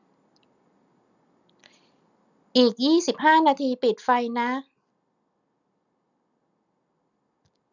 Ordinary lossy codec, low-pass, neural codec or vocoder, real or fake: none; 7.2 kHz; none; real